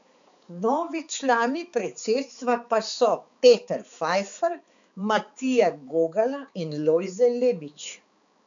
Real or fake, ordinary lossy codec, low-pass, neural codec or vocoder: fake; none; 7.2 kHz; codec, 16 kHz, 4 kbps, X-Codec, HuBERT features, trained on balanced general audio